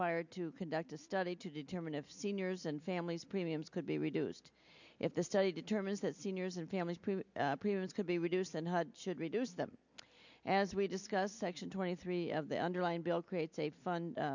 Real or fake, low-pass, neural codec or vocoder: real; 7.2 kHz; none